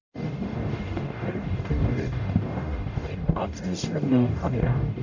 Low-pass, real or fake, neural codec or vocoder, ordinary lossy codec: 7.2 kHz; fake; codec, 44.1 kHz, 0.9 kbps, DAC; none